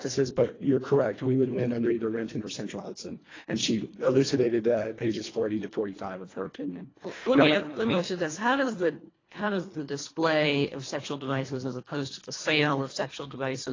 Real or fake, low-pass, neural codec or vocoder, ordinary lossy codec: fake; 7.2 kHz; codec, 24 kHz, 1.5 kbps, HILCodec; AAC, 32 kbps